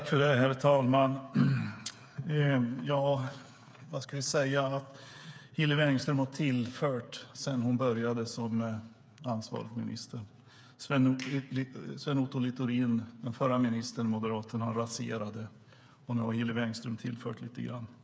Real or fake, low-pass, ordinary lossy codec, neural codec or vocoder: fake; none; none; codec, 16 kHz, 8 kbps, FreqCodec, smaller model